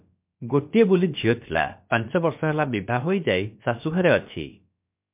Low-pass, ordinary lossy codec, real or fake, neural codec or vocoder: 3.6 kHz; MP3, 32 kbps; fake; codec, 16 kHz, about 1 kbps, DyCAST, with the encoder's durations